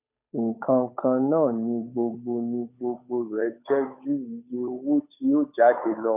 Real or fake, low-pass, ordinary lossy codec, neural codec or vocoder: fake; 3.6 kHz; none; codec, 16 kHz, 8 kbps, FunCodec, trained on Chinese and English, 25 frames a second